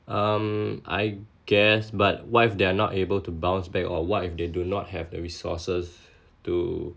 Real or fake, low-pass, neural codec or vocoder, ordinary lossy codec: real; none; none; none